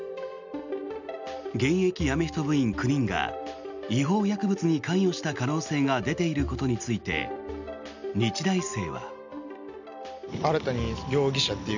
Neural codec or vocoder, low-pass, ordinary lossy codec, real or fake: none; 7.2 kHz; none; real